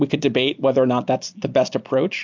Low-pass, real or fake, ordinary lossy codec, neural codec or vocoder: 7.2 kHz; real; MP3, 64 kbps; none